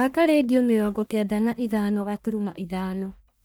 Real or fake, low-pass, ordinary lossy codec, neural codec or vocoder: fake; none; none; codec, 44.1 kHz, 1.7 kbps, Pupu-Codec